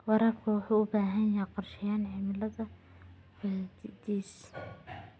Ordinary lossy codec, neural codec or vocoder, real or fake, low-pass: none; none; real; none